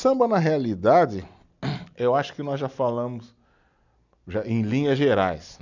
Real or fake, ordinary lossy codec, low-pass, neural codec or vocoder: real; none; 7.2 kHz; none